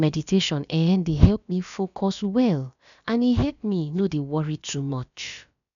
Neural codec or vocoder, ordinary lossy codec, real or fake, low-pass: codec, 16 kHz, about 1 kbps, DyCAST, with the encoder's durations; none; fake; 7.2 kHz